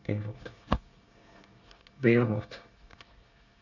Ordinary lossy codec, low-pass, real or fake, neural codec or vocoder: none; 7.2 kHz; fake; codec, 24 kHz, 1 kbps, SNAC